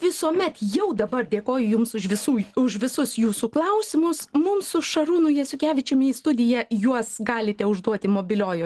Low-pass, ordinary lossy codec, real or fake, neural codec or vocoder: 10.8 kHz; Opus, 16 kbps; real; none